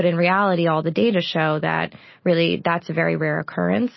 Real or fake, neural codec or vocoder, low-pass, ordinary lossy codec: real; none; 7.2 kHz; MP3, 24 kbps